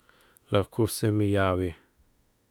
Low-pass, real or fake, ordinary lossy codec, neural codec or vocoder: 19.8 kHz; fake; none; autoencoder, 48 kHz, 32 numbers a frame, DAC-VAE, trained on Japanese speech